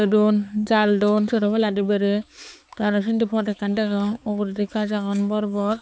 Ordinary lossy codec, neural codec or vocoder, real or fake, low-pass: none; codec, 16 kHz, 4 kbps, X-Codec, HuBERT features, trained on balanced general audio; fake; none